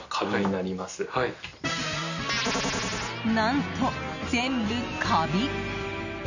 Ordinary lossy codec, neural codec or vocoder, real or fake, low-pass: none; none; real; 7.2 kHz